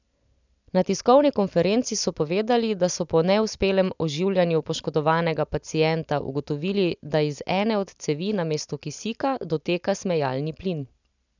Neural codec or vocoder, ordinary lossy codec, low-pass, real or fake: none; none; 7.2 kHz; real